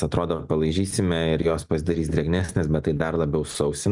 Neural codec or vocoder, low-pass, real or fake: none; 10.8 kHz; real